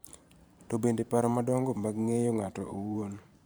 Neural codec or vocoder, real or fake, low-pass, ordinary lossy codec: vocoder, 44.1 kHz, 128 mel bands every 512 samples, BigVGAN v2; fake; none; none